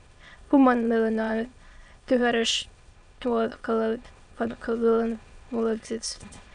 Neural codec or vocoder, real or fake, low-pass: autoencoder, 22.05 kHz, a latent of 192 numbers a frame, VITS, trained on many speakers; fake; 9.9 kHz